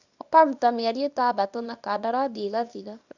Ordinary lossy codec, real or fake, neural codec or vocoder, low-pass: none; fake; codec, 24 kHz, 0.9 kbps, WavTokenizer, small release; 7.2 kHz